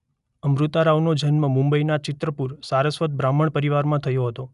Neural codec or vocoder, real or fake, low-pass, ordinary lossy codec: none; real; 10.8 kHz; none